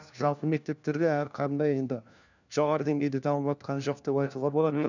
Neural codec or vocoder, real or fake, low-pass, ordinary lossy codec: codec, 16 kHz, 1 kbps, FunCodec, trained on LibriTTS, 50 frames a second; fake; 7.2 kHz; none